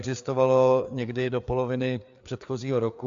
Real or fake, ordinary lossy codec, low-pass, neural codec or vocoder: fake; AAC, 64 kbps; 7.2 kHz; codec, 16 kHz, 4 kbps, FreqCodec, larger model